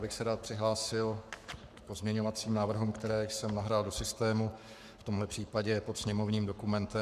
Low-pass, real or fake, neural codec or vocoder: 14.4 kHz; fake; codec, 44.1 kHz, 7.8 kbps, Pupu-Codec